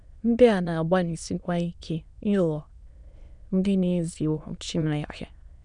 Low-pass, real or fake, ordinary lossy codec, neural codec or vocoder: 9.9 kHz; fake; none; autoencoder, 22.05 kHz, a latent of 192 numbers a frame, VITS, trained on many speakers